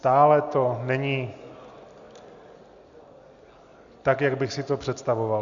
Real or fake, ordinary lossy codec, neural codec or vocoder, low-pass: real; Opus, 64 kbps; none; 7.2 kHz